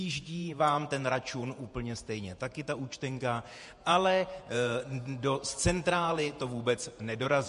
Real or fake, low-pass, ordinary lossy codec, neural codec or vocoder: fake; 14.4 kHz; MP3, 48 kbps; vocoder, 48 kHz, 128 mel bands, Vocos